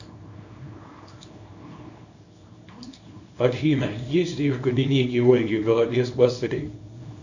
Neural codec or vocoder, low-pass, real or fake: codec, 24 kHz, 0.9 kbps, WavTokenizer, small release; 7.2 kHz; fake